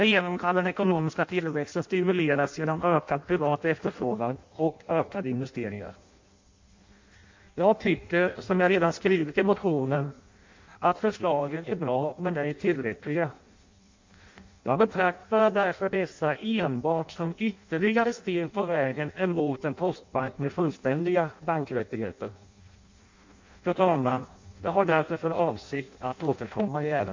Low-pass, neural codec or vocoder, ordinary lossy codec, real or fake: 7.2 kHz; codec, 16 kHz in and 24 kHz out, 0.6 kbps, FireRedTTS-2 codec; MP3, 48 kbps; fake